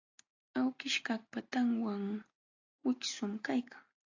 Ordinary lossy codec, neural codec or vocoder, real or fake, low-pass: AAC, 48 kbps; none; real; 7.2 kHz